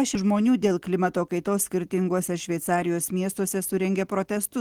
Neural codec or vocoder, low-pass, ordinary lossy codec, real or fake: none; 19.8 kHz; Opus, 16 kbps; real